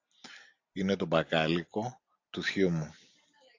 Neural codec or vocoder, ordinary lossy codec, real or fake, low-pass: none; AAC, 48 kbps; real; 7.2 kHz